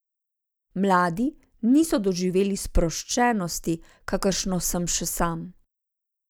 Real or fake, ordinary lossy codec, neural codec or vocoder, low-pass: real; none; none; none